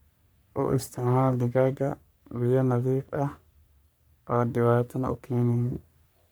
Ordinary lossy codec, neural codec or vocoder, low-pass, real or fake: none; codec, 44.1 kHz, 3.4 kbps, Pupu-Codec; none; fake